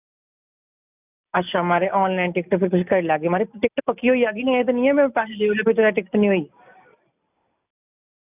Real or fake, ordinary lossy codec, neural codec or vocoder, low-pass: real; none; none; 3.6 kHz